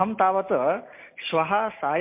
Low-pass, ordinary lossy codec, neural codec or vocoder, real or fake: 3.6 kHz; MP3, 24 kbps; none; real